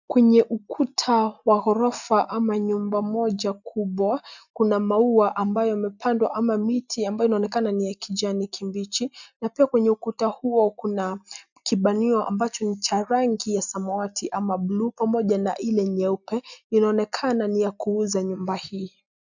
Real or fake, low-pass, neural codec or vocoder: real; 7.2 kHz; none